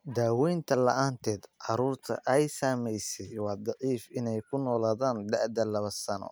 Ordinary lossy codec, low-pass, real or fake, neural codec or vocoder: none; none; real; none